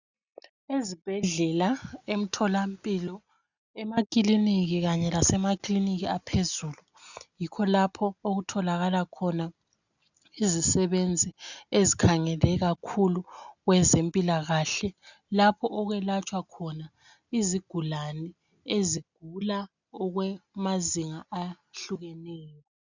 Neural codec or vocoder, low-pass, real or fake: none; 7.2 kHz; real